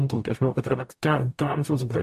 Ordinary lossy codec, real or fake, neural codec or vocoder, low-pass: MP3, 64 kbps; fake; codec, 44.1 kHz, 0.9 kbps, DAC; 14.4 kHz